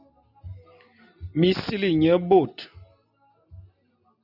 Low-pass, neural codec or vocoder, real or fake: 5.4 kHz; none; real